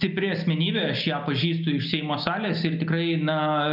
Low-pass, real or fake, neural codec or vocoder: 5.4 kHz; real; none